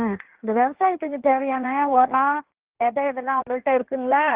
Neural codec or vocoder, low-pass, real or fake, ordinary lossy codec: codec, 16 kHz in and 24 kHz out, 1.1 kbps, FireRedTTS-2 codec; 3.6 kHz; fake; Opus, 16 kbps